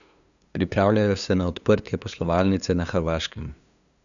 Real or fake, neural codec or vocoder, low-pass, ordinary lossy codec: fake; codec, 16 kHz, 2 kbps, FunCodec, trained on LibriTTS, 25 frames a second; 7.2 kHz; none